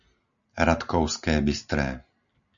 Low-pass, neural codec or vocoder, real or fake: 7.2 kHz; none; real